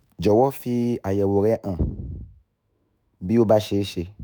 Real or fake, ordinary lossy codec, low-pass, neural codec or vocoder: fake; none; none; autoencoder, 48 kHz, 128 numbers a frame, DAC-VAE, trained on Japanese speech